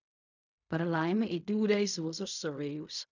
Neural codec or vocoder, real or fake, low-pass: codec, 16 kHz in and 24 kHz out, 0.4 kbps, LongCat-Audio-Codec, fine tuned four codebook decoder; fake; 7.2 kHz